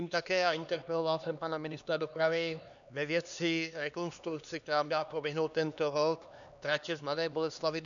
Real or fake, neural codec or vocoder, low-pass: fake; codec, 16 kHz, 2 kbps, X-Codec, HuBERT features, trained on LibriSpeech; 7.2 kHz